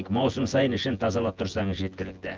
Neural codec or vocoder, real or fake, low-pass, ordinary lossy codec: vocoder, 24 kHz, 100 mel bands, Vocos; fake; 7.2 kHz; Opus, 24 kbps